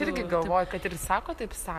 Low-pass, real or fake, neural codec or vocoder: 14.4 kHz; fake; vocoder, 44.1 kHz, 128 mel bands every 256 samples, BigVGAN v2